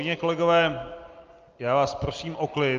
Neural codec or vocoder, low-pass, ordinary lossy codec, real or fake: none; 7.2 kHz; Opus, 24 kbps; real